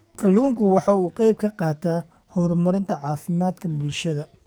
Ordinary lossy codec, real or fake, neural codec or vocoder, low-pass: none; fake; codec, 44.1 kHz, 2.6 kbps, SNAC; none